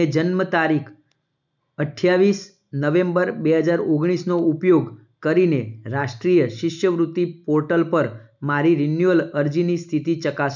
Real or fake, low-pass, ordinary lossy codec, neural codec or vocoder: real; 7.2 kHz; none; none